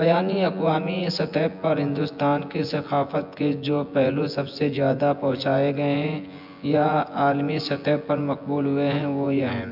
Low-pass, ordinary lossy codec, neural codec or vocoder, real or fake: 5.4 kHz; MP3, 48 kbps; vocoder, 24 kHz, 100 mel bands, Vocos; fake